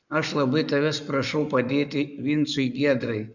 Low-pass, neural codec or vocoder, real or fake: 7.2 kHz; codec, 44.1 kHz, 7.8 kbps, Pupu-Codec; fake